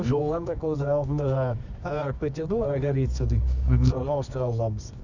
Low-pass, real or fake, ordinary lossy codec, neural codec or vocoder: 7.2 kHz; fake; none; codec, 24 kHz, 0.9 kbps, WavTokenizer, medium music audio release